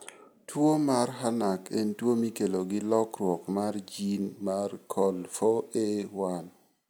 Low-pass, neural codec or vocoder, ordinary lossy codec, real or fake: none; none; none; real